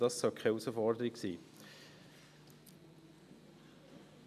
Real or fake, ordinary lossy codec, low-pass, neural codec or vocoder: real; none; 14.4 kHz; none